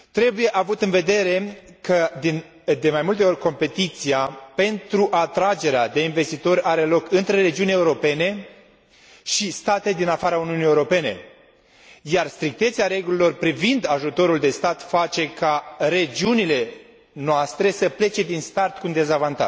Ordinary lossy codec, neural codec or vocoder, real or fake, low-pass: none; none; real; none